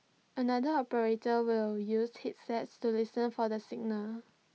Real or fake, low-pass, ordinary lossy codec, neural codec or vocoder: real; none; none; none